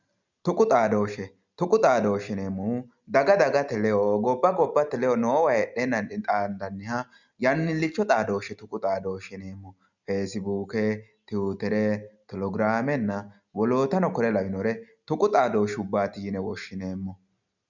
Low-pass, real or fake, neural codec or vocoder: 7.2 kHz; real; none